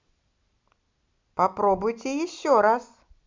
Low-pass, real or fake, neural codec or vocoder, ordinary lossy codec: 7.2 kHz; real; none; MP3, 64 kbps